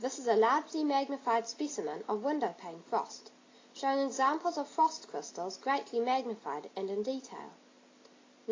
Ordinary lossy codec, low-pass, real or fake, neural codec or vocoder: AAC, 32 kbps; 7.2 kHz; real; none